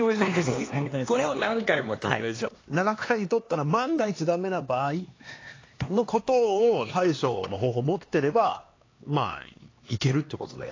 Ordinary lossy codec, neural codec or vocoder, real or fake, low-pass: AAC, 32 kbps; codec, 16 kHz, 2 kbps, X-Codec, HuBERT features, trained on LibriSpeech; fake; 7.2 kHz